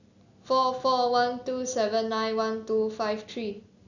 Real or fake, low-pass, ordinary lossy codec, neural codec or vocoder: real; 7.2 kHz; none; none